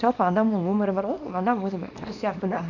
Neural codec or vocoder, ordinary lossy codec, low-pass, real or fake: codec, 24 kHz, 0.9 kbps, WavTokenizer, small release; none; 7.2 kHz; fake